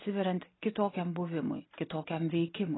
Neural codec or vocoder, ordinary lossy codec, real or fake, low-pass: none; AAC, 16 kbps; real; 7.2 kHz